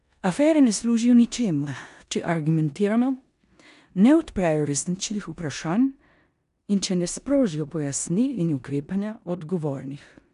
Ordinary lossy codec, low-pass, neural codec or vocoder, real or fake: none; 10.8 kHz; codec, 16 kHz in and 24 kHz out, 0.9 kbps, LongCat-Audio-Codec, four codebook decoder; fake